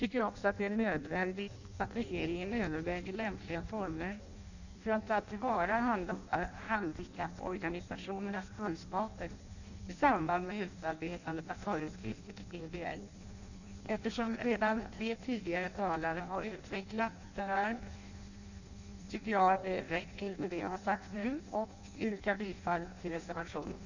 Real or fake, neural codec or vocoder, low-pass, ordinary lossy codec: fake; codec, 16 kHz in and 24 kHz out, 0.6 kbps, FireRedTTS-2 codec; 7.2 kHz; none